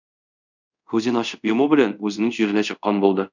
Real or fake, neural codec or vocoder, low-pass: fake; codec, 24 kHz, 0.5 kbps, DualCodec; 7.2 kHz